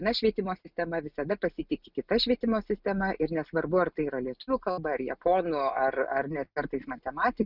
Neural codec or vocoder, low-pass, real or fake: none; 5.4 kHz; real